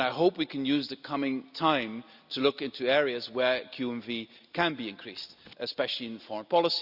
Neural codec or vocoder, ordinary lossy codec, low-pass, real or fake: none; Opus, 64 kbps; 5.4 kHz; real